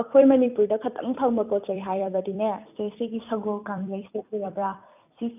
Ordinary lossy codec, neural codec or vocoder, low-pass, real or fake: none; vocoder, 44.1 kHz, 128 mel bands every 256 samples, BigVGAN v2; 3.6 kHz; fake